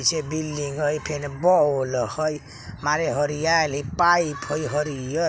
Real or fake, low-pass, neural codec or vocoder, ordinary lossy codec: real; none; none; none